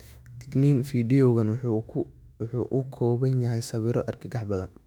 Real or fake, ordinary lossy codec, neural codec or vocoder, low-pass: fake; none; autoencoder, 48 kHz, 32 numbers a frame, DAC-VAE, trained on Japanese speech; 19.8 kHz